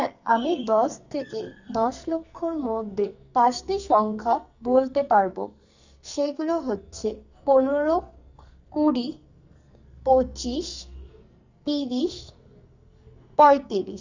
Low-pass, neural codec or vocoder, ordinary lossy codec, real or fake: 7.2 kHz; codec, 44.1 kHz, 2.6 kbps, SNAC; Opus, 64 kbps; fake